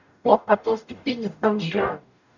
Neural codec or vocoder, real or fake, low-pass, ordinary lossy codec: codec, 44.1 kHz, 0.9 kbps, DAC; fake; 7.2 kHz; none